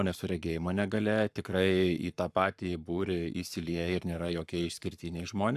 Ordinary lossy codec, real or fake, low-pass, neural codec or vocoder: AAC, 96 kbps; fake; 14.4 kHz; codec, 44.1 kHz, 7.8 kbps, Pupu-Codec